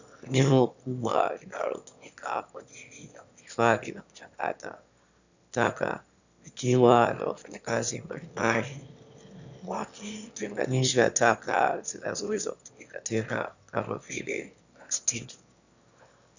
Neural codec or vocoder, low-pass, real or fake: autoencoder, 22.05 kHz, a latent of 192 numbers a frame, VITS, trained on one speaker; 7.2 kHz; fake